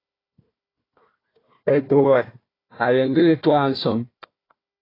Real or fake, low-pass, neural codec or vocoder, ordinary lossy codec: fake; 5.4 kHz; codec, 16 kHz, 1 kbps, FunCodec, trained on Chinese and English, 50 frames a second; AAC, 24 kbps